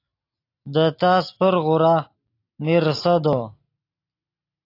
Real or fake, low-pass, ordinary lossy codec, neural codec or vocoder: real; 5.4 kHz; AAC, 32 kbps; none